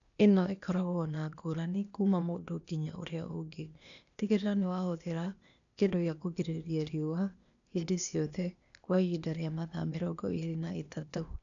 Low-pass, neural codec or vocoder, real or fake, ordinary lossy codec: 7.2 kHz; codec, 16 kHz, 0.8 kbps, ZipCodec; fake; none